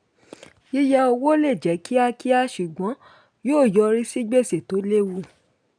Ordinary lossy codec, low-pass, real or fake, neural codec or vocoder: none; 9.9 kHz; real; none